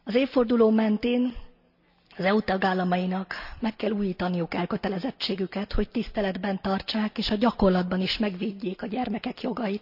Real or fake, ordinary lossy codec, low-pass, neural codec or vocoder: real; none; 5.4 kHz; none